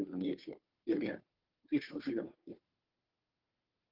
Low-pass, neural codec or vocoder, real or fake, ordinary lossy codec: 5.4 kHz; codec, 16 kHz, 2 kbps, FunCodec, trained on Chinese and English, 25 frames a second; fake; Opus, 32 kbps